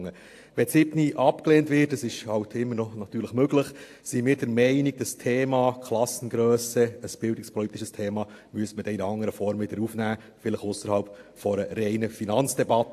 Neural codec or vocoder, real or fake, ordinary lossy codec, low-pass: none; real; AAC, 64 kbps; 14.4 kHz